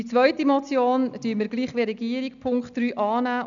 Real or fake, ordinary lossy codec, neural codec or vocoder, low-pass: real; none; none; 7.2 kHz